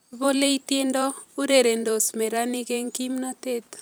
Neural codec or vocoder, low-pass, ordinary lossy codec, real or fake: vocoder, 44.1 kHz, 128 mel bands every 512 samples, BigVGAN v2; none; none; fake